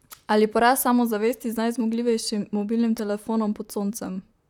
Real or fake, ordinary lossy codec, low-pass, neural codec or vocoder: fake; none; 19.8 kHz; vocoder, 44.1 kHz, 128 mel bands, Pupu-Vocoder